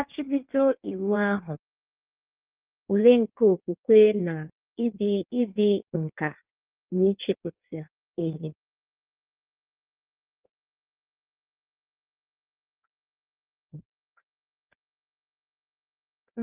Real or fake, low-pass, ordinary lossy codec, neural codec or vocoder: fake; 3.6 kHz; Opus, 24 kbps; codec, 16 kHz in and 24 kHz out, 1.1 kbps, FireRedTTS-2 codec